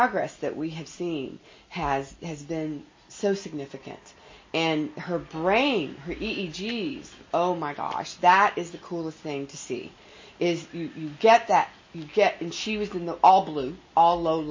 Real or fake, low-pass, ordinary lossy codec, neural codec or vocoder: real; 7.2 kHz; MP3, 32 kbps; none